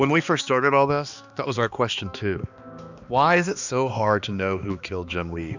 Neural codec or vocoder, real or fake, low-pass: codec, 16 kHz, 2 kbps, X-Codec, HuBERT features, trained on balanced general audio; fake; 7.2 kHz